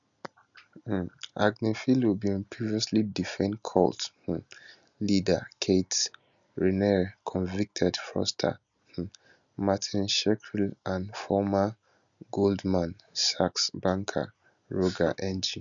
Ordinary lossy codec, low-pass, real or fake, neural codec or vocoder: MP3, 96 kbps; 7.2 kHz; real; none